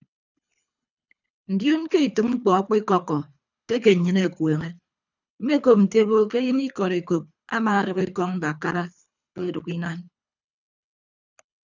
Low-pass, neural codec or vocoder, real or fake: 7.2 kHz; codec, 24 kHz, 3 kbps, HILCodec; fake